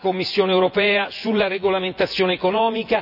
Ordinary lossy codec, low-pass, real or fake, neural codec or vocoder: none; 5.4 kHz; fake; vocoder, 24 kHz, 100 mel bands, Vocos